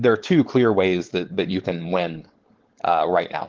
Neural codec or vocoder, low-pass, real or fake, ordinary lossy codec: codec, 16 kHz, 4.8 kbps, FACodec; 7.2 kHz; fake; Opus, 16 kbps